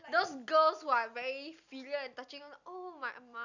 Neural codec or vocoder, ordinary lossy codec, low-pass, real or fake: none; none; 7.2 kHz; real